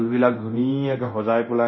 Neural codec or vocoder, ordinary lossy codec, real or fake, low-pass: codec, 24 kHz, 0.9 kbps, DualCodec; MP3, 24 kbps; fake; 7.2 kHz